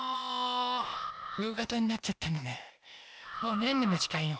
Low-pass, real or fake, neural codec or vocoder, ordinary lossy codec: none; fake; codec, 16 kHz, 0.8 kbps, ZipCodec; none